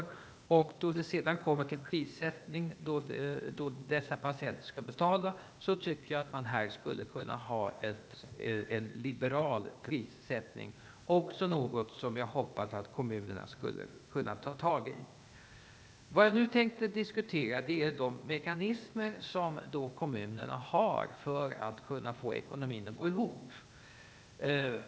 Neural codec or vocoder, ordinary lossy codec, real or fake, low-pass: codec, 16 kHz, 0.8 kbps, ZipCodec; none; fake; none